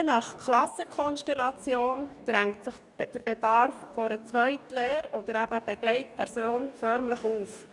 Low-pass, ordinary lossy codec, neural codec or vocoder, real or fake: 10.8 kHz; none; codec, 44.1 kHz, 2.6 kbps, DAC; fake